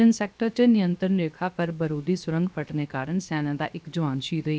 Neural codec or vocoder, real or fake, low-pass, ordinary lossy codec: codec, 16 kHz, 0.7 kbps, FocalCodec; fake; none; none